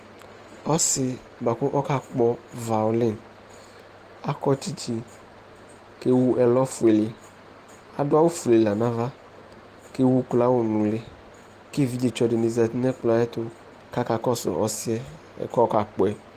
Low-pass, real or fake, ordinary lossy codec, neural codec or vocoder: 14.4 kHz; real; Opus, 24 kbps; none